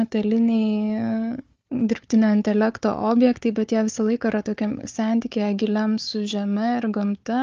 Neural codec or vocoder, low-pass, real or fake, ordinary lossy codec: codec, 16 kHz, 4 kbps, FunCodec, trained on Chinese and English, 50 frames a second; 7.2 kHz; fake; Opus, 32 kbps